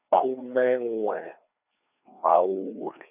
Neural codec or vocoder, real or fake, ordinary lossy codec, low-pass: codec, 16 kHz, 2 kbps, FreqCodec, larger model; fake; none; 3.6 kHz